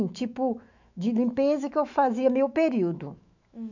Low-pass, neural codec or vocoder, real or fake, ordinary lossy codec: 7.2 kHz; autoencoder, 48 kHz, 128 numbers a frame, DAC-VAE, trained on Japanese speech; fake; none